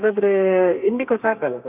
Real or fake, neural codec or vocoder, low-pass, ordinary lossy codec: fake; codec, 32 kHz, 1.9 kbps, SNAC; 3.6 kHz; none